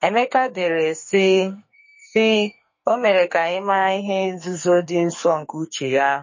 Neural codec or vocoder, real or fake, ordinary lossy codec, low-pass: codec, 44.1 kHz, 2.6 kbps, SNAC; fake; MP3, 32 kbps; 7.2 kHz